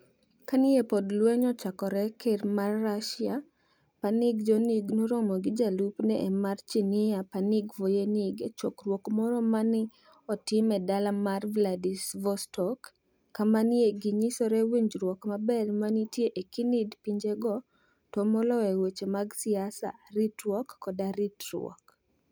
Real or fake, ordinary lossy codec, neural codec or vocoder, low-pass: real; none; none; none